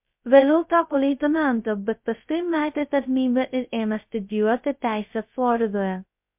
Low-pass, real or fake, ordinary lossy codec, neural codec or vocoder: 3.6 kHz; fake; MP3, 32 kbps; codec, 16 kHz, 0.2 kbps, FocalCodec